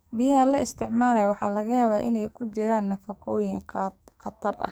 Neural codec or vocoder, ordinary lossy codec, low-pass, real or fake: codec, 44.1 kHz, 2.6 kbps, SNAC; none; none; fake